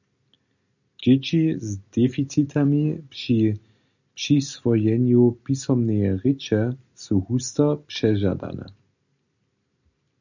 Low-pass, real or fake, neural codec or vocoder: 7.2 kHz; real; none